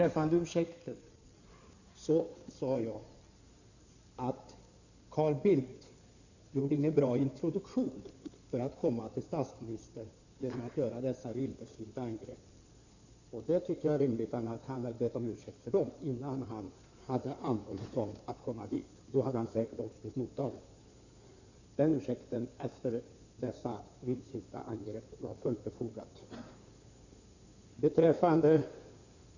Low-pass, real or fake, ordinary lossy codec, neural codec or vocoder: 7.2 kHz; fake; none; codec, 16 kHz in and 24 kHz out, 2.2 kbps, FireRedTTS-2 codec